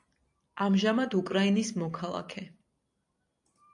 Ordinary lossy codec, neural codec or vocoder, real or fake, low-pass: AAC, 64 kbps; none; real; 10.8 kHz